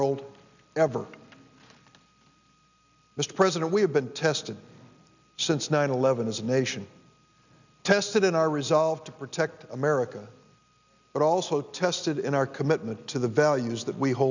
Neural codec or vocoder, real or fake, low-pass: none; real; 7.2 kHz